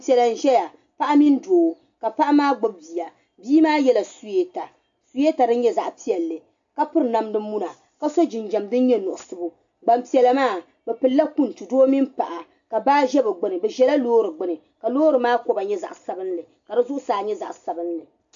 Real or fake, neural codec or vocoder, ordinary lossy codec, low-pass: real; none; AAC, 64 kbps; 7.2 kHz